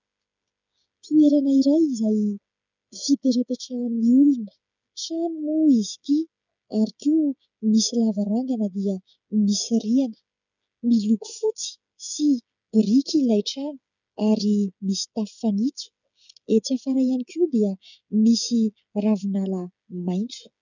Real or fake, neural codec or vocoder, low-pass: fake; codec, 16 kHz, 8 kbps, FreqCodec, smaller model; 7.2 kHz